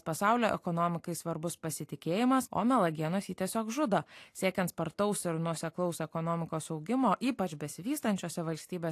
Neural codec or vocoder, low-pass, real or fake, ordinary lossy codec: none; 14.4 kHz; real; AAC, 64 kbps